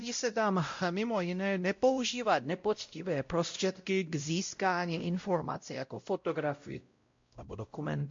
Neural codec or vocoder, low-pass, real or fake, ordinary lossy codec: codec, 16 kHz, 0.5 kbps, X-Codec, WavLM features, trained on Multilingual LibriSpeech; 7.2 kHz; fake; MP3, 48 kbps